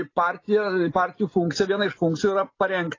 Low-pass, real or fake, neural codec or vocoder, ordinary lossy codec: 7.2 kHz; real; none; AAC, 32 kbps